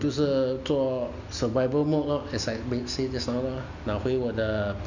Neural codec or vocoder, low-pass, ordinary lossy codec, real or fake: none; 7.2 kHz; none; real